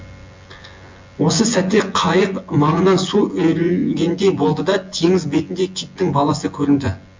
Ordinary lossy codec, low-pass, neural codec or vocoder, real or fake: MP3, 48 kbps; 7.2 kHz; vocoder, 24 kHz, 100 mel bands, Vocos; fake